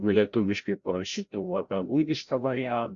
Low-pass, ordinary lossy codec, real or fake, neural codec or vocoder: 7.2 kHz; Opus, 64 kbps; fake; codec, 16 kHz, 0.5 kbps, FreqCodec, larger model